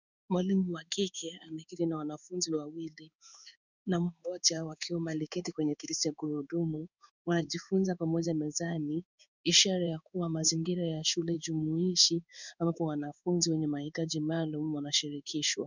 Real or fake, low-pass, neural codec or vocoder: fake; 7.2 kHz; codec, 16 kHz in and 24 kHz out, 1 kbps, XY-Tokenizer